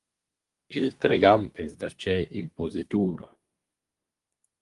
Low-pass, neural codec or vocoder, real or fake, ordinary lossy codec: 10.8 kHz; codec, 24 kHz, 1 kbps, SNAC; fake; Opus, 32 kbps